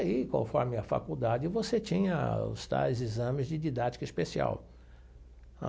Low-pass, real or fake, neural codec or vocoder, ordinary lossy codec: none; real; none; none